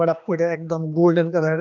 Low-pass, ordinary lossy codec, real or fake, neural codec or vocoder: 7.2 kHz; none; fake; codec, 16 kHz, 2 kbps, X-Codec, HuBERT features, trained on general audio